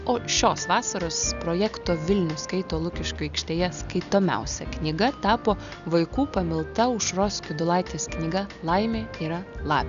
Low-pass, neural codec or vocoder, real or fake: 7.2 kHz; none; real